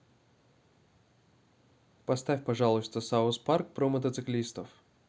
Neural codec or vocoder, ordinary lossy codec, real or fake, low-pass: none; none; real; none